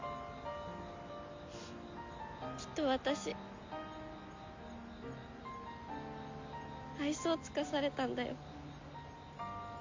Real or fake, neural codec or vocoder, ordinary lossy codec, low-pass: real; none; MP3, 64 kbps; 7.2 kHz